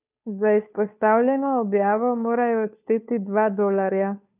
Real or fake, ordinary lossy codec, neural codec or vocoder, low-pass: fake; none; codec, 16 kHz, 2 kbps, FunCodec, trained on Chinese and English, 25 frames a second; 3.6 kHz